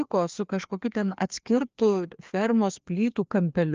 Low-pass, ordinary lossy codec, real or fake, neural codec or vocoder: 7.2 kHz; Opus, 24 kbps; fake; codec, 16 kHz, 2 kbps, FreqCodec, larger model